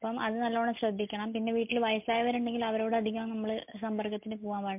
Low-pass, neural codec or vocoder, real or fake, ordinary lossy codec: 3.6 kHz; none; real; MP3, 32 kbps